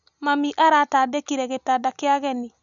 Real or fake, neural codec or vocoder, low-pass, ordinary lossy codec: real; none; 7.2 kHz; none